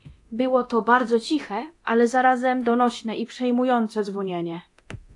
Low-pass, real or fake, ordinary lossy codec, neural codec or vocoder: 10.8 kHz; fake; AAC, 48 kbps; codec, 24 kHz, 0.9 kbps, DualCodec